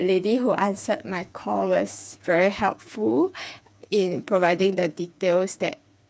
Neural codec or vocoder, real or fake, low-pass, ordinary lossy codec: codec, 16 kHz, 4 kbps, FreqCodec, smaller model; fake; none; none